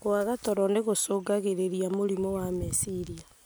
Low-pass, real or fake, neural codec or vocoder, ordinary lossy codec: none; real; none; none